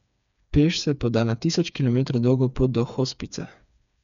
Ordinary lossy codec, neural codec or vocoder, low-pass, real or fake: none; codec, 16 kHz, 4 kbps, FreqCodec, smaller model; 7.2 kHz; fake